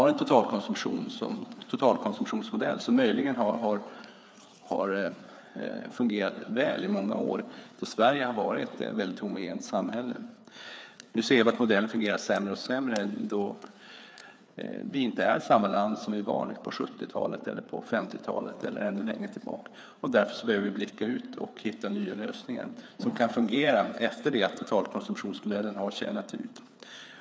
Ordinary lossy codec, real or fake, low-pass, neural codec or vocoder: none; fake; none; codec, 16 kHz, 4 kbps, FreqCodec, larger model